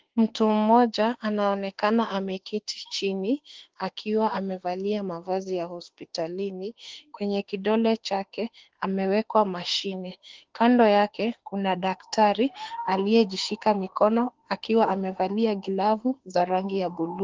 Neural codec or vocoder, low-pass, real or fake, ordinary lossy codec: autoencoder, 48 kHz, 32 numbers a frame, DAC-VAE, trained on Japanese speech; 7.2 kHz; fake; Opus, 16 kbps